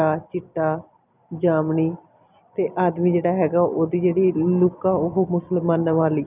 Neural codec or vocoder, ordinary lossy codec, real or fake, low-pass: none; none; real; 3.6 kHz